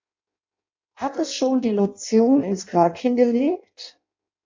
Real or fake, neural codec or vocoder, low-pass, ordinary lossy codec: fake; codec, 16 kHz in and 24 kHz out, 0.6 kbps, FireRedTTS-2 codec; 7.2 kHz; MP3, 48 kbps